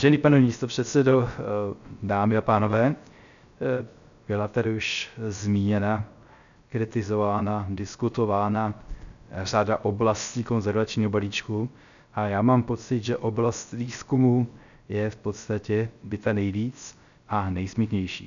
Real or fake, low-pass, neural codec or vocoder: fake; 7.2 kHz; codec, 16 kHz, 0.3 kbps, FocalCodec